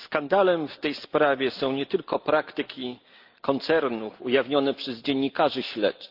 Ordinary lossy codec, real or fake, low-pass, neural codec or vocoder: Opus, 32 kbps; real; 5.4 kHz; none